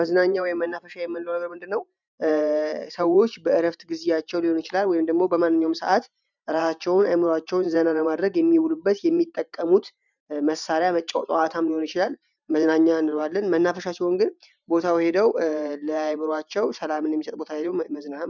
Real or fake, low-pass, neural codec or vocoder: fake; 7.2 kHz; vocoder, 44.1 kHz, 128 mel bands every 512 samples, BigVGAN v2